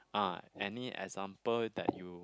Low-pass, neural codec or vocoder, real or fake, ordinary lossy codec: none; none; real; none